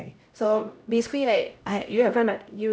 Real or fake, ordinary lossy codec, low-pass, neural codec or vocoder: fake; none; none; codec, 16 kHz, 0.5 kbps, X-Codec, HuBERT features, trained on LibriSpeech